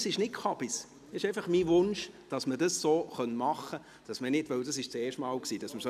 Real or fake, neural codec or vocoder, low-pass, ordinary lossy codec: real; none; 14.4 kHz; none